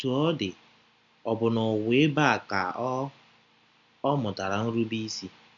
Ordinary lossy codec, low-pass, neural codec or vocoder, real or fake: none; 7.2 kHz; none; real